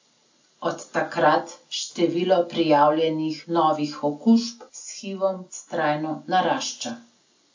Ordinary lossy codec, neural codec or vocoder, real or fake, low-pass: AAC, 48 kbps; none; real; 7.2 kHz